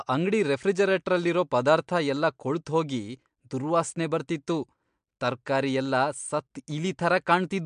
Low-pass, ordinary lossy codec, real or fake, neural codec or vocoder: 9.9 kHz; MP3, 64 kbps; real; none